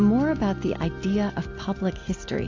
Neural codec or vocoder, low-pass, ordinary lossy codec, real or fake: none; 7.2 kHz; AAC, 48 kbps; real